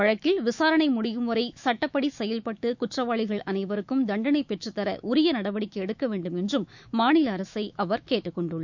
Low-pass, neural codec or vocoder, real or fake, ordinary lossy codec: 7.2 kHz; autoencoder, 48 kHz, 128 numbers a frame, DAC-VAE, trained on Japanese speech; fake; none